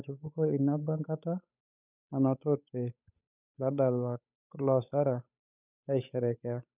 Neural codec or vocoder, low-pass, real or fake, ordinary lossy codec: codec, 16 kHz, 8 kbps, FunCodec, trained on Chinese and English, 25 frames a second; 3.6 kHz; fake; none